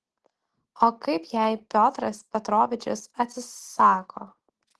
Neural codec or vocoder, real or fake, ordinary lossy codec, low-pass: none; real; Opus, 16 kbps; 10.8 kHz